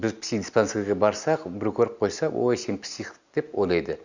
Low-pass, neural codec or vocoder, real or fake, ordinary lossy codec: 7.2 kHz; none; real; Opus, 64 kbps